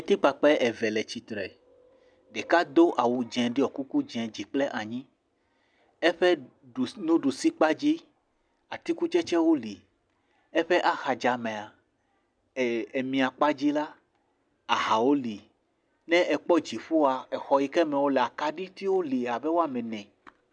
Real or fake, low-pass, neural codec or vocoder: real; 9.9 kHz; none